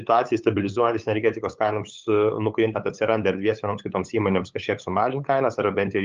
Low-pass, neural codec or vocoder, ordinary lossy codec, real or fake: 7.2 kHz; codec, 16 kHz, 8 kbps, FunCodec, trained on LibriTTS, 25 frames a second; Opus, 24 kbps; fake